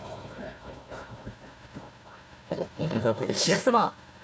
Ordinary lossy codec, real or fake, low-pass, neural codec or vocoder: none; fake; none; codec, 16 kHz, 1 kbps, FunCodec, trained on Chinese and English, 50 frames a second